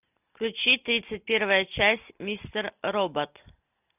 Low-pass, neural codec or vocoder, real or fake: 3.6 kHz; none; real